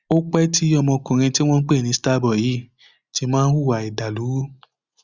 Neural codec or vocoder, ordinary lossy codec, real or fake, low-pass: none; Opus, 64 kbps; real; 7.2 kHz